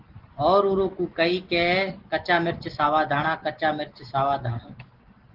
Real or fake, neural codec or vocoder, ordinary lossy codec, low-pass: real; none; Opus, 16 kbps; 5.4 kHz